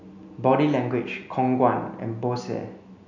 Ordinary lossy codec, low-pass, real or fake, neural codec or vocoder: none; 7.2 kHz; real; none